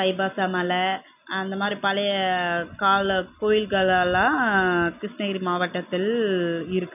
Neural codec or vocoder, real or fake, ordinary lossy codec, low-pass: none; real; none; 3.6 kHz